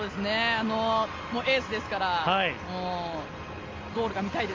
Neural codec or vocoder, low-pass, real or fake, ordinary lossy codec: none; 7.2 kHz; real; Opus, 32 kbps